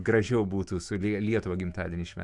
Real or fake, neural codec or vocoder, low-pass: fake; autoencoder, 48 kHz, 128 numbers a frame, DAC-VAE, trained on Japanese speech; 10.8 kHz